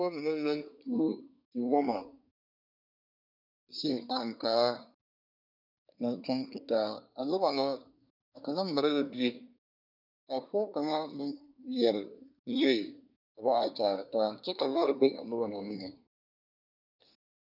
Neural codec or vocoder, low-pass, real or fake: codec, 24 kHz, 1 kbps, SNAC; 5.4 kHz; fake